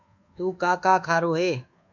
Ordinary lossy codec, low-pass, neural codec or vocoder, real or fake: MP3, 64 kbps; 7.2 kHz; codec, 24 kHz, 1.2 kbps, DualCodec; fake